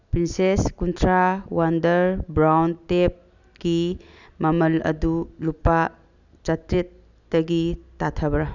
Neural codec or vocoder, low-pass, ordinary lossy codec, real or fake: none; 7.2 kHz; none; real